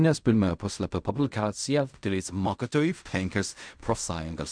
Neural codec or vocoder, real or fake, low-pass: codec, 16 kHz in and 24 kHz out, 0.4 kbps, LongCat-Audio-Codec, fine tuned four codebook decoder; fake; 9.9 kHz